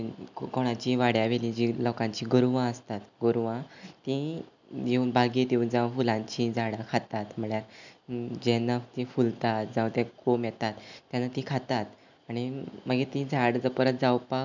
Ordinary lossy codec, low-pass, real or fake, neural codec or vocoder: none; 7.2 kHz; real; none